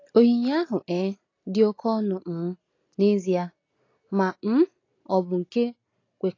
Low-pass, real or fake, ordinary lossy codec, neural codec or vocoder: 7.2 kHz; real; AAC, 32 kbps; none